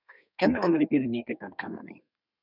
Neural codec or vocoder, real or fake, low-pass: codec, 32 kHz, 1.9 kbps, SNAC; fake; 5.4 kHz